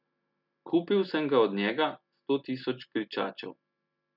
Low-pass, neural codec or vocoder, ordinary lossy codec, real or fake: 5.4 kHz; none; none; real